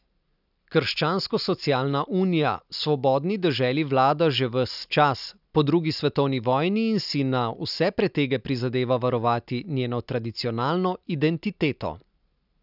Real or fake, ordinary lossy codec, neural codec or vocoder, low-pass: real; none; none; 5.4 kHz